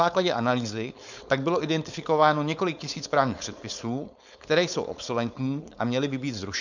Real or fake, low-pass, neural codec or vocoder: fake; 7.2 kHz; codec, 16 kHz, 4.8 kbps, FACodec